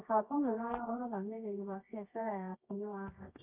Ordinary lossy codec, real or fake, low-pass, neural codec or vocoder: none; fake; 3.6 kHz; codec, 24 kHz, 0.9 kbps, WavTokenizer, medium music audio release